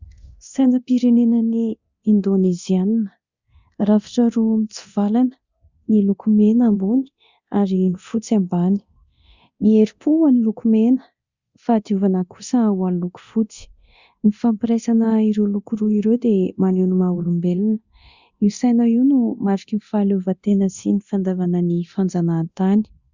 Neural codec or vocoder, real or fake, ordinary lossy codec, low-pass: codec, 24 kHz, 0.9 kbps, DualCodec; fake; Opus, 64 kbps; 7.2 kHz